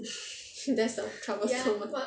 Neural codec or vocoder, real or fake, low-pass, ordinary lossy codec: none; real; none; none